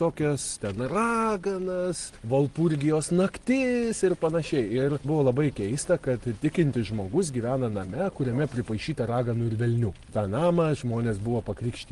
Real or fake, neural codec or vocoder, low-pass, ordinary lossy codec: real; none; 10.8 kHz; Opus, 24 kbps